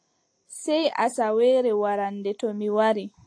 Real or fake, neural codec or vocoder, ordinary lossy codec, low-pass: real; none; AAC, 48 kbps; 9.9 kHz